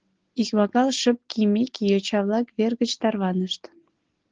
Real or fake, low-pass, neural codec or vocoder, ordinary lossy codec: real; 7.2 kHz; none; Opus, 16 kbps